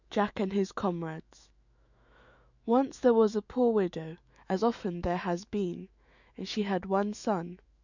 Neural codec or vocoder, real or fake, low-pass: autoencoder, 48 kHz, 128 numbers a frame, DAC-VAE, trained on Japanese speech; fake; 7.2 kHz